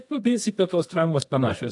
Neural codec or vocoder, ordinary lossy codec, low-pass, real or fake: codec, 24 kHz, 0.9 kbps, WavTokenizer, medium music audio release; AAC, 64 kbps; 10.8 kHz; fake